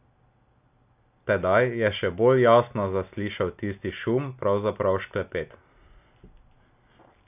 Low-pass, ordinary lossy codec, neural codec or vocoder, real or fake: 3.6 kHz; none; none; real